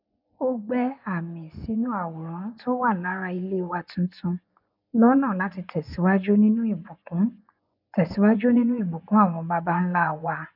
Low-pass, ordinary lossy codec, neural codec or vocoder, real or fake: 5.4 kHz; none; vocoder, 24 kHz, 100 mel bands, Vocos; fake